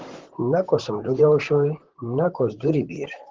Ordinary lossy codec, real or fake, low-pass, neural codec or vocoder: Opus, 16 kbps; fake; 7.2 kHz; vocoder, 44.1 kHz, 128 mel bands, Pupu-Vocoder